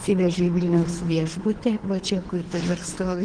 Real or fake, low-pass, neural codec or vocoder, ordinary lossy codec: fake; 9.9 kHz; codec, 24 kHz, 3 kbps, HILCodec; Opus, 16 kbps